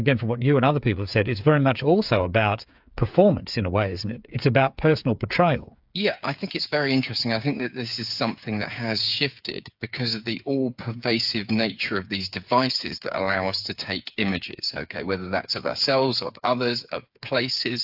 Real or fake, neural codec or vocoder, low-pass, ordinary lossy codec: fake; codec, 16 kHz, 8 kbps, FreqCodec, smaller model; 5.4 kHz; AAC, 48 kbps